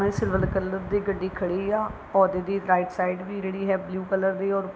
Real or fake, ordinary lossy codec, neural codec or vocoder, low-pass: real; none; none; none